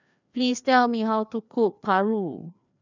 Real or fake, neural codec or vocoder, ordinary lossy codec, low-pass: fake; codec, 16 kHz, 2 kbps, FreqCodec, larger model; none; 7.2 kHz